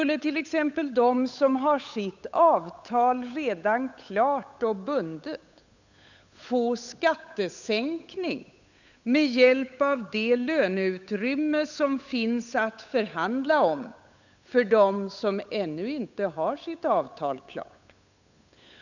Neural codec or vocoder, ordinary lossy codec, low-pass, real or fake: codec, 16 kHz, 8 kbps, FunCodec, trained on Chinese and English, 25 frames a second; none; 7.2 kHz; fake